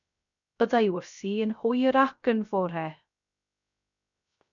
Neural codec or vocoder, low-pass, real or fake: codec, 16 kHz, 0.3 kbps, FocalCodec; 7.2 kHz; fake